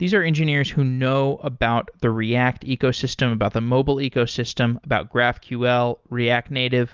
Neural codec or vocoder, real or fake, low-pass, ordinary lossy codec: none; real; 7.2 kHz; Opus, 32 kbps